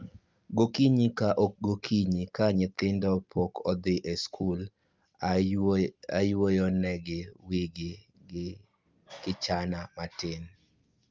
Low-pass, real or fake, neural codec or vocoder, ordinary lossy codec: 7.2 kHz; real; none; Opus, 24 kbps